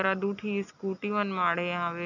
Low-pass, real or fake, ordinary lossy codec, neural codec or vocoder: 7.2 kHz; real; none; none